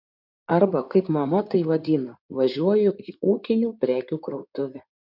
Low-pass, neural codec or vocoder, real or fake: 5.4 kHz; codec, 16 kHz in and 24 kHz out, 2.2 kbps, FireRedTTS-2 codec; fake